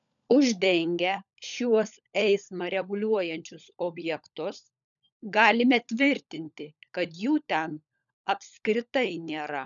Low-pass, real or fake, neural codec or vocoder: 7.2 kHz; fake; codec, 16 kHz, 16 kbps, FunCodec, trained on LibriTTS, 50 frames a second